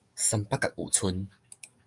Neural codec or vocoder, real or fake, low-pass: codec, 44.1 kHz, 7.8 kbps, DAC; fake; 10.8 kHz